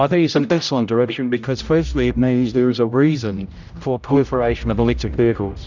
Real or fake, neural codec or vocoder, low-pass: fake; codec, 16 kHz, 0.5 kbps, X-Codec, HuBERT features, trained on general audio; 7.2 kHz